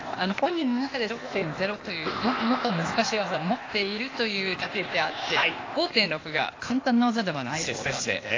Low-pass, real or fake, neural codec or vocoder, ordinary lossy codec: 7.2 kHz; fake; codec, 16 kHz, 0.8 kbps, ZipCodec; AAC, 32 kbps